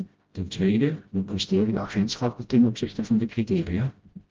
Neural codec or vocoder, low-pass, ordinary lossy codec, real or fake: codec, 16 kHz, 0.5 kbps, FreqCodec, smaller model; 7.2 kHz; Opus, 24 kbps; fake